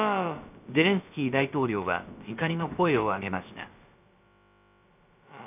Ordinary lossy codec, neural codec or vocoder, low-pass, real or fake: none; codec, 16 kHz, about 1 kbps, DyCAST, with the encoder's durations; 3.6 kHz; fake